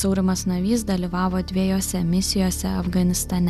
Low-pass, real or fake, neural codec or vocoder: 14.4 kHz; real; none